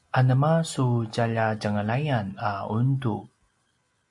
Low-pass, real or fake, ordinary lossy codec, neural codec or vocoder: 10.8 kHz; real; MP3, 48 kbps; none